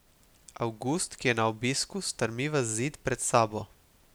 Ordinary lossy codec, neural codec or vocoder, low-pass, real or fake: none; none; none; real